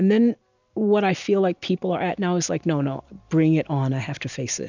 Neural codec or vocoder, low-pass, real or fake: none; 7.2 kHz; real